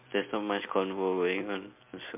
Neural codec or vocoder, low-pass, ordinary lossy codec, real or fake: none; 3.6 kHz; MP3, 32 kbps; real